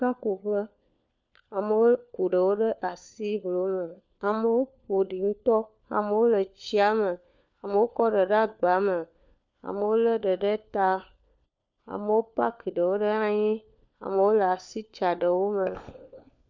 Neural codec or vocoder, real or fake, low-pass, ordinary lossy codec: codec, 16 kHz, 4 kbps, FunCodec, trained on LibriTTS, 50 frames a second; fake; 7.2 kHz; MP3, 64 kbps